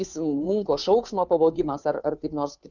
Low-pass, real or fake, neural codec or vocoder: 7.2 kHz; fake; codec, 16 kHz, 2 kbps, FunCodec, trained on Chinese and English, 25 frames a second